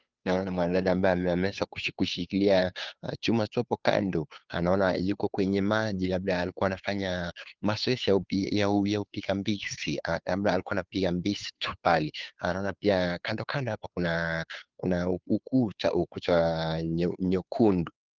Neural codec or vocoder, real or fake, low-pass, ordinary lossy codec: codec, 16 kHz, 2 kbps, FunCodec, trained on Chinese and English, 25 frames a second; fake; 7.2 kHz; Opus, 32 kbps